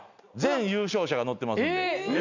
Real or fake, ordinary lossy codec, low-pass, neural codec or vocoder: real; none; 7.2 kHz; none